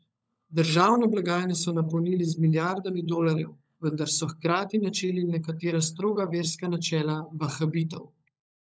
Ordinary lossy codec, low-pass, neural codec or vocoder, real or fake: none; none; codec, 16 kHz, 16 kbps, FunCodec, trained on LibriTTS, 50 frames a second; fake